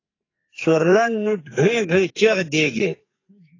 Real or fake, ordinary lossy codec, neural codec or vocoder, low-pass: fake; AAC, 32 kbps; codec, 44.1 kHz, 2.6 kbps, SNAC; 7.2 kHz